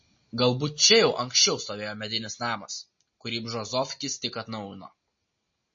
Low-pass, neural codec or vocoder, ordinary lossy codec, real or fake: 7.2 kHz; none; MP3, 32 kbps; real